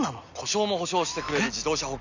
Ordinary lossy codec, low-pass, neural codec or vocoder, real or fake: none; 7.2 kHz; none; real